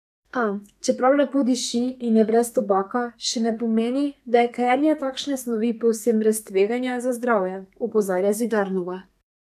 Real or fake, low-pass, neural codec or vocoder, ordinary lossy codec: fake; 14.4 kHz; codec, 32 kHz, 1.9 kbps, SNAC; none